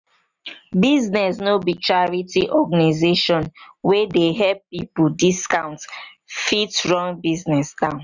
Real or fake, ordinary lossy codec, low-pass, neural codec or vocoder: real; none; 7.2 kHz; none